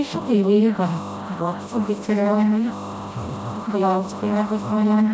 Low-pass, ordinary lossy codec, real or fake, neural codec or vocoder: none; none; fake; codec, 16 kHz, 0.5 kbps, FreqCodec, smaller model